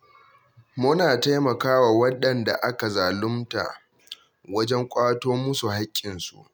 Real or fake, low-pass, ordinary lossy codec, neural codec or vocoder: real; none; none; none